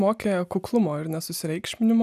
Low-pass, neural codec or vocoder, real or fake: 14.4 kHz; none; real